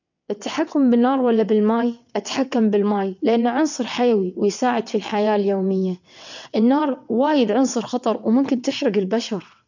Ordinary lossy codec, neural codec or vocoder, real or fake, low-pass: none; vocoder, 22.05 kHz, 80 mel bands, WaveNeXt; fake; 7.2 kHz